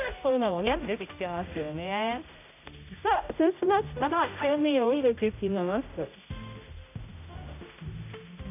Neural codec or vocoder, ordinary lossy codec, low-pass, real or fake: codec, 16 kHz, 0.5 kbps, X-Codec, HuBERT features, trained on general audio; none; 3.6 kHz; fake